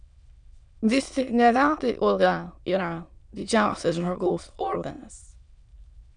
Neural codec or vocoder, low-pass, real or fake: autoencoder, 22.05 kHz, a latent of 192 numbers a frame, VITS, trained on many speakers; 9.9 kHz; fake